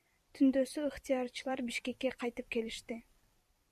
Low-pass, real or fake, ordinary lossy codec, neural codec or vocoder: 14.4 kHz; fake; MP3, 96 kbps; vocoder, 44.1 kHz, 128 mel bands every 256 samples, BigVGAN v2